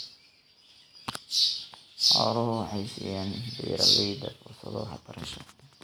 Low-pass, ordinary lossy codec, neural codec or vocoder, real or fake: none; none; none; real